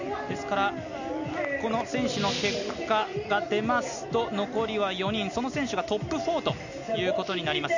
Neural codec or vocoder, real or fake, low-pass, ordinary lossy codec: none; real; 7.2 kHz; none